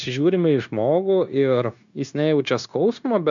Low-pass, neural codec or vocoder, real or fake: 7.2 kHz; codec, 16 kHz, 0.9 kbps, LongCat-Audio-Codec; fake